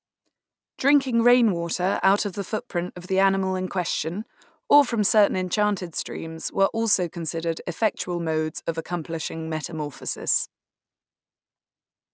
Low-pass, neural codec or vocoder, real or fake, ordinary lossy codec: none; none; real; none